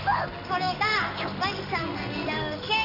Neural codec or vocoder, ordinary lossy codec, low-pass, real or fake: codec, 16 kHz in and 24 kHz out, 1 kbps, XY-Tokenizer; none; 5.4 kHz; fake